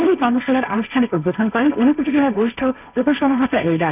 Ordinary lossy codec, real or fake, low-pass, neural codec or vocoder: none; fake; 3.6 kHz; codec, 16 kHz, 1.1 kbps, Voila-Tokenizer